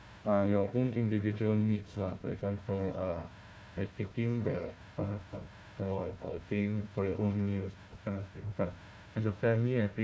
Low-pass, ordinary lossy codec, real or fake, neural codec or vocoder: none; none; fake; codec, 16 kHz, 1 kbps, FunCodec, trained on Chinese and English, 50 frames a second